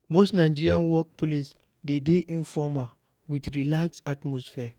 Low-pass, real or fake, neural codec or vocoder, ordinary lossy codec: 19.8 kHz; fake; codec, 44.1 kHz, 2.6 kbps, DAC; none